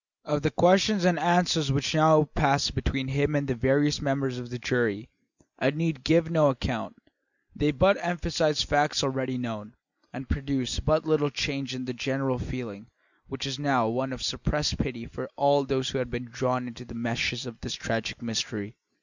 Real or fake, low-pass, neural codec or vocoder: real; 7.2 kHz; none